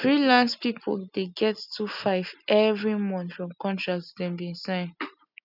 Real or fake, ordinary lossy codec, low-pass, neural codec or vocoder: real; none; 5.4 kHz; none